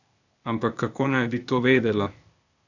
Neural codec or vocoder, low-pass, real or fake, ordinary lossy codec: codec, 16 kHz, 0.8 kbps, ZipCodec; 7.2 kHz; fake; none